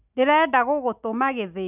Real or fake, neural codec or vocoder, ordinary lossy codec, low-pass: real; none; none; 3.6 kHz